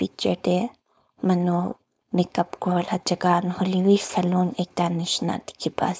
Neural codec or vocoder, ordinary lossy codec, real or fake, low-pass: codec, 16 kHz, 4.8 kbps, FACodec; none; fake; none